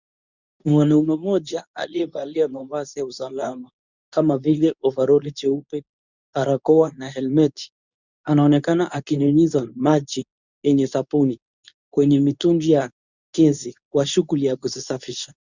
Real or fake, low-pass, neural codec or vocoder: fake; 7.2 kHz; codec, 24 kHz, 0.9 kbps, WavTokenizer, medium speech release version 2